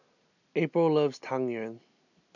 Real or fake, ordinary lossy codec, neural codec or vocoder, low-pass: real; none; none; 7.2 kHz